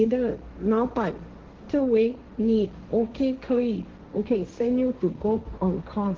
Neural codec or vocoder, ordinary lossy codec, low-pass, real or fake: codec, 16 kHz, 1.1 kbps, Voila-Tokenizer; Opus, 16 kbps; 7.2 kHz; fake